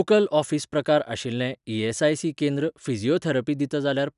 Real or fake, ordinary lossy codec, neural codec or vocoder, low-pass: real; none; none; 10.8 kHz